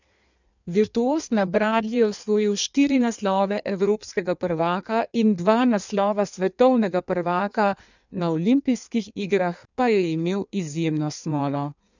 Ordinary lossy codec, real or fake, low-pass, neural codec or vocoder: none; fake; 7.2 kHz; codec, 16 kHz in and 24 kHz out, 1.1 kbps, FireRedTTS-2 codec